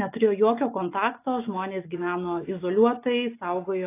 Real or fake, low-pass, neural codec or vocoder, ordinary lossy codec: real; 3.6 kHz; none; AAC, 24 kbps